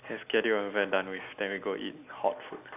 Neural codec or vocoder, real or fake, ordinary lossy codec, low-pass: none; real; none; 3.6 kHz